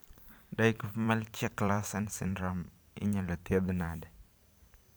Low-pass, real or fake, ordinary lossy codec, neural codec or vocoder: none; fake; none; vocoder, 44.1 kHz, 128 mel bands every 512 samples, BigVGAN v2